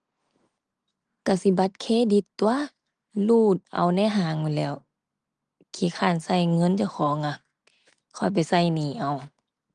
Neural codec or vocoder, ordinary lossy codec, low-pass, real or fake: none; Opus, 24 kbps; 9.9 kHz; real